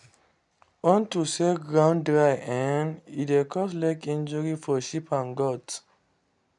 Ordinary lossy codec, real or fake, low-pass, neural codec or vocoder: none; real; 10.8 kHz; none